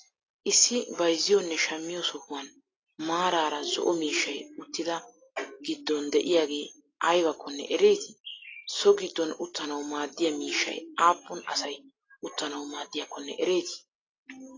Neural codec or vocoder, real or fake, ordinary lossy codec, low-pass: none; real; AAC, 32 kbps; 7.2 kHz